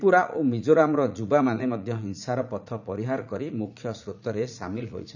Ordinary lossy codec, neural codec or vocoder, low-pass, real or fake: none; vocoder, 44.1 kHz, 80 mel bands, Vocos; 7.2 kHz; fake